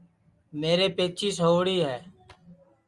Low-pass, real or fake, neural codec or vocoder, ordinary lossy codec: 10.8 kHz; real; none; Opus, 32 kbps